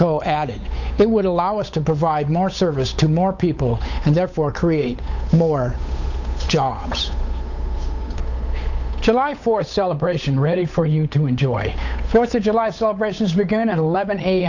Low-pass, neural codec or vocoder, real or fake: 7.2 kHz; codec, 16 kHz, 8 kbps, FunCodec, trained on Chinese and English, 25 frames a second; fake